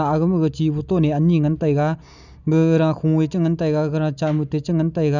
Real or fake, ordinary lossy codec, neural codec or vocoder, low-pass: real; none; none; 7.2 kHz